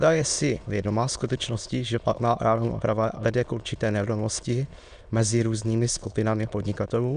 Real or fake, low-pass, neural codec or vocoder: fake; 9.9 kHz; autoencoder, 22.05 kHz, a latent of 192 numbers a frame, VITS, trained on many speakers